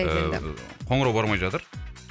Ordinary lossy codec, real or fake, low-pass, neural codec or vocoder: none; real; none; none